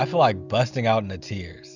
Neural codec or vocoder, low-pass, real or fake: none; 7.2 kHz; real